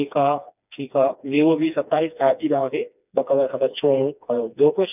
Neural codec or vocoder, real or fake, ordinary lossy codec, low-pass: codec, 16 kHz, 2 kbps, FreqCodec, smaller model; fake; none; 3.6 kHz